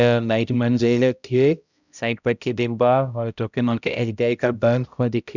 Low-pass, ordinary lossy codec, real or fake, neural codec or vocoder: 7.2 kHz; none; fake; codec, 16 kHz, 0.5 kbps, X-Codec, HuBERT features, trained on balanced general audio